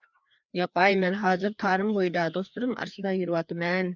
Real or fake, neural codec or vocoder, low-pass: fake; codec, 16 kHz, 2 kbps, FreqCodec, larger model; 7.2 kHz